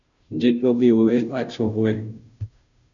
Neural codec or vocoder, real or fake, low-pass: codec, 16 kHz, 0.5 kbps, FunCodec, trained on Chinese and English, 25 frames a second; fake; 7.2 kHz